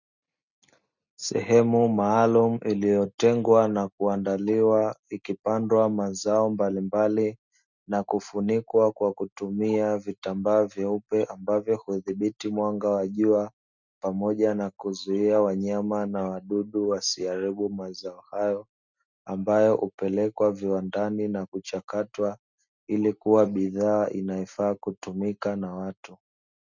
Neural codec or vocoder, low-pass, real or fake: none; 7.2 kHz; real